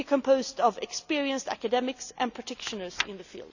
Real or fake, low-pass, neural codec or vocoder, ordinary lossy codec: real; 7.2 kHz; none; none